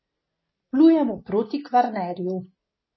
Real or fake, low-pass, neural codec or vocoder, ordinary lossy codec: real; 7.2 kHz; none; MP3, 24 kbps